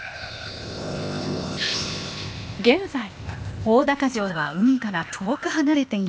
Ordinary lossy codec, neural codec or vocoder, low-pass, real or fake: none; codec, 16 kHz, 0.8 kbps, ZipCodec; none; fake